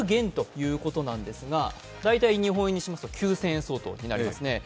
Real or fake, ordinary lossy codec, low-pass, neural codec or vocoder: real; none; none; none